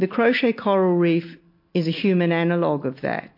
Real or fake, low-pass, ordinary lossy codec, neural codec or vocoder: real; 5.4 kHz; MP3, 32 kbps; none